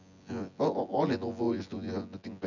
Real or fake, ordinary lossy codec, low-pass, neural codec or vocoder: fake; none; 7.2 kHz; vocoder, 24 kHz, 100 mel bands, Vocos